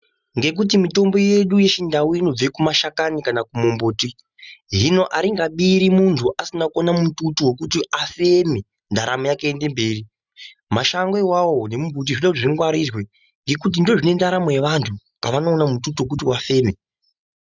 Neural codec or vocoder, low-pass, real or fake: none; 7.2 kHz; real